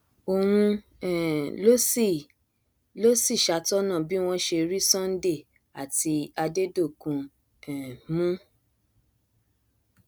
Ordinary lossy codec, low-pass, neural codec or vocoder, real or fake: none; none; none; real